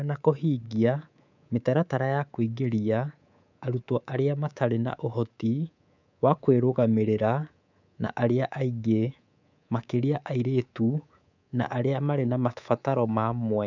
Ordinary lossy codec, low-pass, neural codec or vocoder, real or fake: none; 7.2 kHz; codec, 24 kHz, 3.1 kbps, DualCodec; fake